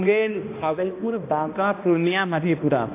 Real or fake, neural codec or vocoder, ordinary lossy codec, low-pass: fake; codec, 16 kHz, 1 kbps, X-Codec, HuBERT features, trained on balanced general audio; AAC, 24 kbps; 3.6 kHz